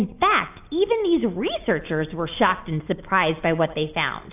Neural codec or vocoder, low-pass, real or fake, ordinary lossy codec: none; 3.6 kHz; real; AAC, 32 kbps